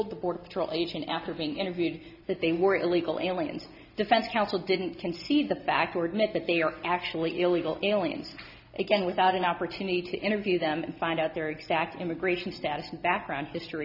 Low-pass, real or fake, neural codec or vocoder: 5.4 kHz; real; none